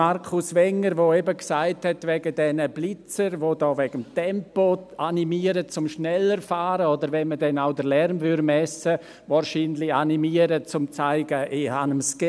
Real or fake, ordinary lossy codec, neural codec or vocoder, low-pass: real; none; none; none